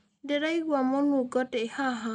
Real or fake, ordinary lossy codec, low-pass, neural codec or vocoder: real; none; 9.9 kHz; none